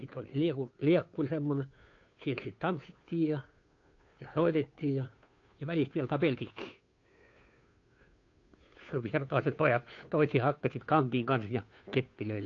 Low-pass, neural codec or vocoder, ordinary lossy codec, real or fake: 7.2 kHz; codec, 16 kHz, 2 kbps, FunCodec, trained on Chinese and English, 25 frames a second; AAC, 48 kbps; fake